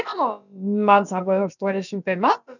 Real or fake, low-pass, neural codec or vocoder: fake; 7.2 kHz; codec, 16 kHz, about 1 kbps, DyCAST, with the encoder's durations